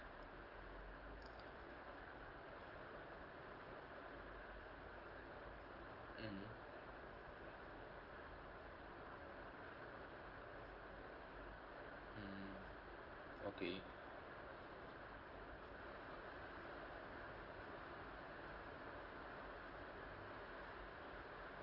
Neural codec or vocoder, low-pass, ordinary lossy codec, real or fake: none; 5.4 kHz; Opus, 32 kbps; real